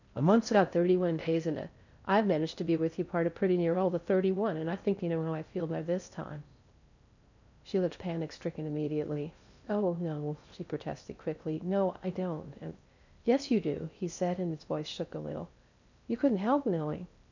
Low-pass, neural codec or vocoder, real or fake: 7.2 kHz; codec, 16 kHz in and 24 kHz out, 0.6 kbps, FocalCodec, streaming, 4096 codes; fake